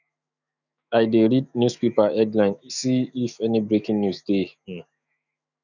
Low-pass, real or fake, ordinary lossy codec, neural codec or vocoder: 7.2 kHz; fake; none; autoencoder, 48 kHz, 128 numbers a frame, DAC-VAE, trained on Japanese speech